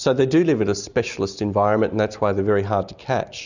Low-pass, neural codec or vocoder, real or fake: 7.2 kHz; none; real